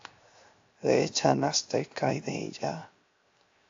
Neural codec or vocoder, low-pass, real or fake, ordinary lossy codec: codec, 16 kHz, 0.7 kbps, FocalCodec; 7.2 kHz; fake; AAC, 48 kbps